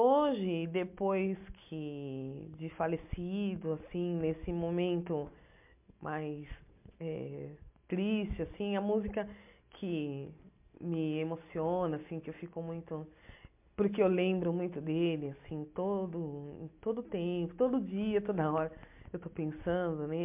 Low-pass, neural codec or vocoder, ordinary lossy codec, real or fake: 3.6 kHz; none; MP3, 32 kbps; real